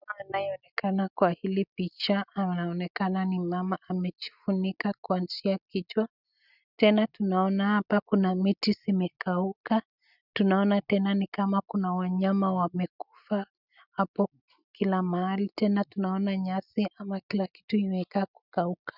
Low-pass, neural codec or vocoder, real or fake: 5.4 kHz; none; real